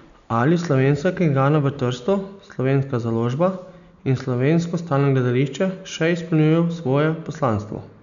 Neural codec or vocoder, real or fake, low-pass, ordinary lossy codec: none; real; 7.2 kHz; none